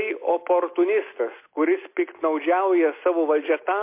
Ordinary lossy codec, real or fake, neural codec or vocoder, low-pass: MP3, 24 kbps; real; none; 3.6 kHz